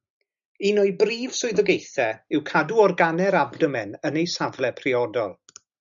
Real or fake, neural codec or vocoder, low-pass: real; none; 7.2 kHz